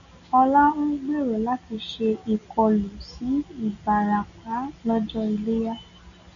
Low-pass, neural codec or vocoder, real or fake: 7.2 kHz; none; real